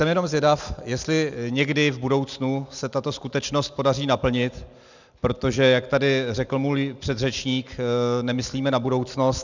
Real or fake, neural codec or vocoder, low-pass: real; none; 7.2 kHz